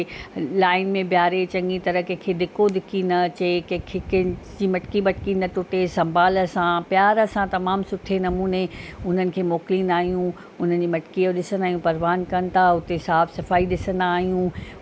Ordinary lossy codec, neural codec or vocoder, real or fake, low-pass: none; none; real; none